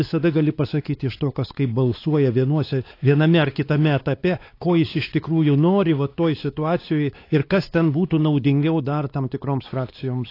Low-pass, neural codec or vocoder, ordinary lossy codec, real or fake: 5.4 kHz; codec, 16 kHz, 4 kbps, X-Codec, WavLM features, trained on Multilingual LibriSpeech; AAC, 32 kbps; fake